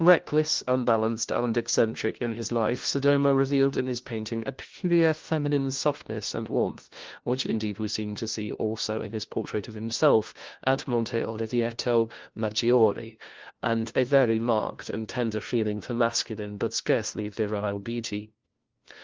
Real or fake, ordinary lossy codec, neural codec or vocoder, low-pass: fake; Opus, 16 kbps; codec, 16 kHz, 1 kbps, FunCodec, trained on LibriTTS, 50 frames a second; 7.2 kHz